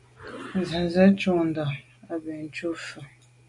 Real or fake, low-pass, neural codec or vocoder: real; 10.8 kHz; none